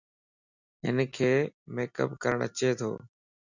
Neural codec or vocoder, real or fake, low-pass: none; real; 7.2 kHz